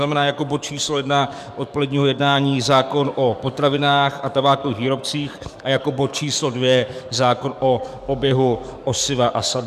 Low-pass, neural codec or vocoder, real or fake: 14.4 kHz; codec, 44.1 kHz, 7.8 kbps, DAC; fake